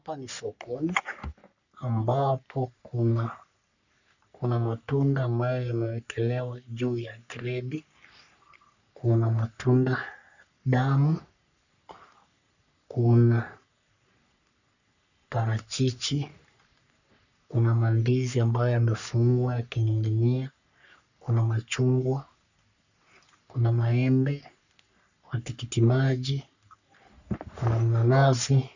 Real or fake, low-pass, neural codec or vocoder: fake; 7.2 kHz; codec, 44.1 kHz, 3.4 kbps, Pupu-Codec